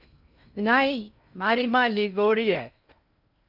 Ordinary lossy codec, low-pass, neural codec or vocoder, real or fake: Opus, 64 kbps; 5.4 kHz; codec, 16 kHz in and 24 kHz out, 0.6 kbps, FocalCodec, streaming, 2048 codes; fake